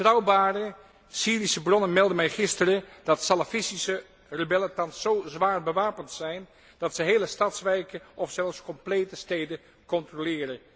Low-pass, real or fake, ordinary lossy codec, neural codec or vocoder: none; real; none; none